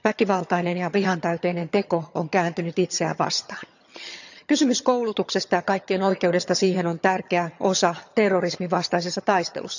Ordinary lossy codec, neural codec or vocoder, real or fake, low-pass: none; vocoder, 22.05 kHz, 80 mel bands, HiFi-GAN; fake; 7.2 kHz